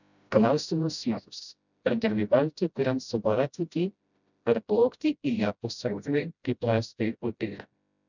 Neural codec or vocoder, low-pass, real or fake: codec, 16 kHz, 0.5 kbps, FreqCodec, smaller model; 7.2 kHz; fake